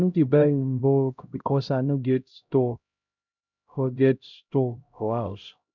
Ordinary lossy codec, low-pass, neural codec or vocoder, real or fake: none; 7.2 kHz; codec, 16 kHz, 0.5 kbps, X-Codec, HuBERT features, trained on LibriSpeech; fake